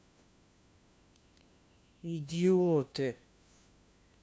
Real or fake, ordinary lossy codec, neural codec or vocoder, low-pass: fake; none; codec, 16 kHz, 1 kbps, FunCodec, trained on LibriTTS, 50 frames a second; none